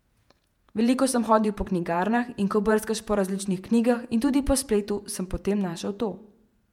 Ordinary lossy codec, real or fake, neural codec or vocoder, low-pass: MP3, 96 kbps; fake; vocoder, 48 kHz, 128 mel bands, Vocos; 19.8 kHz